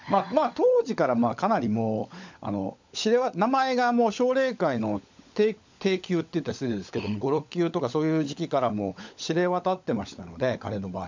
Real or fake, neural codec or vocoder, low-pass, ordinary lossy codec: fake; codec, 16 kHz, 16 kbps, FunCodec, trained on LibriTTS, 50 frames a second; 7.2 kHz; MP3, 64 kbps